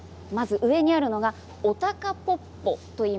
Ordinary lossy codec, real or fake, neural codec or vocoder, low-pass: none; real; none; none